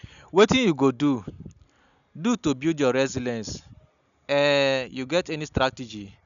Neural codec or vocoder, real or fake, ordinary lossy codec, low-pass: none; real; none; 7.2 kHz